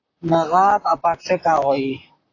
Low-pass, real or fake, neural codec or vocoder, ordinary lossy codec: 7.2 kHz; fake; vocoder, 44.1 kHz, 128 mel bands, Pupu-Vocoder; AAC, 32 kbps